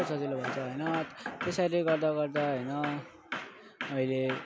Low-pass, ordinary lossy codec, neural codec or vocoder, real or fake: none; none; none; real